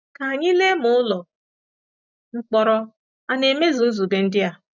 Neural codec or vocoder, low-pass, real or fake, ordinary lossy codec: none; 7.2 kHz; real; none